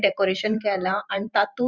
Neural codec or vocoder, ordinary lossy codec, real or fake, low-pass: vocoder, 44.1 kHz, 128 mel bands every 512 samples, BigVGAN v2; Opus, 64 kbps; fake; 7.2 kHz